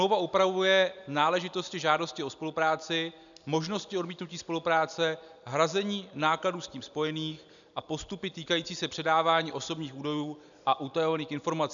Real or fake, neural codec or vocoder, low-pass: real; none; 7.2 kHz